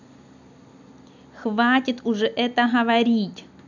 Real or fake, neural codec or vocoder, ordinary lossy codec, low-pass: real; none; none; 7.2 kHz